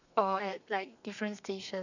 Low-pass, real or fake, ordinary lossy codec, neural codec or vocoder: 7.2 kHz; fake; none; codec, 32 kHz, 1.9 kbps, SNAC